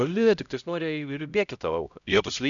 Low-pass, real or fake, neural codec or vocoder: 7.2 kHz; fake; codec, 16 kHz, 0.5 kbps, X-Codec, HuBERT features, trained on LibriSpeech